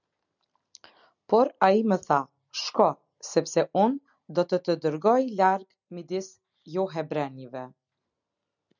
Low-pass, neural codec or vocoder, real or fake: 7.2 kHz; none; real